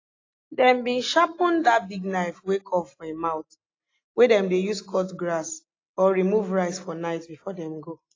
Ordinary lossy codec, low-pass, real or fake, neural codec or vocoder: AAC, 32 kbps; 7.2 kHz; real; none